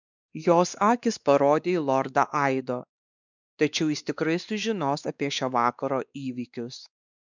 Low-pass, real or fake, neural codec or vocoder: 7.2 kHz; fake; codec, 16 kHz, 2 kbps, X-Codec, WavLM features, trained on Multilingual LibriSpeech